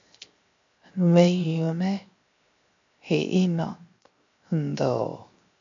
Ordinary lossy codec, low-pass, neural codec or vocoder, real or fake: MP3, 64 kbps; 7.2 kHz; codec, 16 kHz, 0.7 kbps, FocalCodec; fake